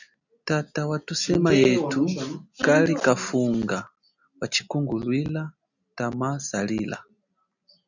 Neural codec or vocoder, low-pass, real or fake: none; 7.2 kHz; real